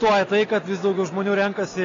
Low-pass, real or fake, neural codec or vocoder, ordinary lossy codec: 7.2 kHz; real; none; AAC, 32 kbps